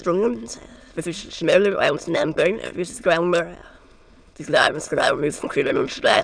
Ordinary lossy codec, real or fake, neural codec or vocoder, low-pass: none; fake; autoencoder, 22.05 kHz, a latent of 192 numbers a frame, VITS, trained on many speakers; 9.9 kHz